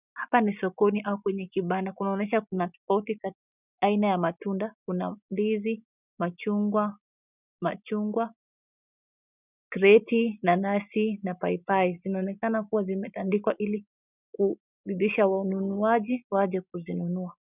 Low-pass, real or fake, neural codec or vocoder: 3.6 kHz; real; none